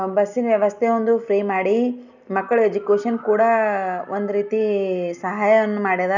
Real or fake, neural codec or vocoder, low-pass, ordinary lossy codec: real; none; 7.2 kHz; none